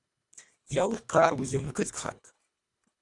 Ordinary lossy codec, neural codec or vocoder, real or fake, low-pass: Opus, 64 kbps; codec, 24 kHz, 1.5 kbps, HILCodec; fake; 10.8 kHz